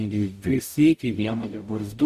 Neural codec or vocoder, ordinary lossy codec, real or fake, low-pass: codec, 44.1 kHz, 0.9 kbps, DAC; Opus, 64 kbps; fake; 14.4 kHz